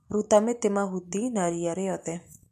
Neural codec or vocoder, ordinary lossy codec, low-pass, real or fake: none; MP3, 64 kbps; 14.4 kHz; real